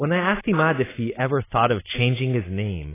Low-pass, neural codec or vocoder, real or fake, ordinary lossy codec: 3.6 kHz; none; real; AAC, 16 kbps